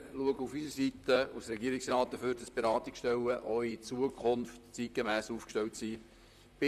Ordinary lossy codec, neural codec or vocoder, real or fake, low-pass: none; vocoder, 44.1 kHz, 128 mel bands, Pupu-Vocoder; fake; 14.4 kHz